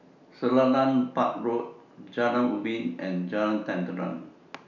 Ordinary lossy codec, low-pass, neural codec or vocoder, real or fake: none; 7.2 kHz; none; real